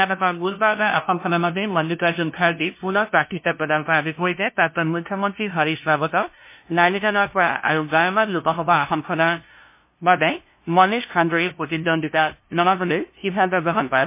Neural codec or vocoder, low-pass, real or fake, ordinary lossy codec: codec, 16 kHz, 0.5 kbps, FunCodec, trained on LibriTTS, 25 frames a second; 3.6 kHz; fake; MP3, 24 kbps